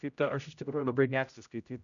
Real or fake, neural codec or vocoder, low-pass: fake; codec, 16 kHz, 0.5 kbps, X-Codec, HuBERT features, trained on general audio; 7.2 kHz